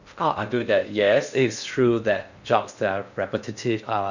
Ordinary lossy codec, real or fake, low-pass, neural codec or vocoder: none; fake; 7.2 kHz; codec, 16 kHz in and 24 kHz out, 0.6 kbps, FocalCodec, streaming, 2048 codes